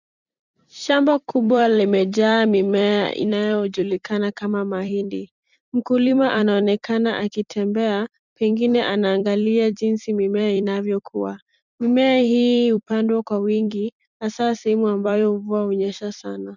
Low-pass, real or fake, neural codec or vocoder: 7.2 kHz; fake; vocoder, 44.1 kHz, 128 mel bands every 256 samples, BigVGAN v2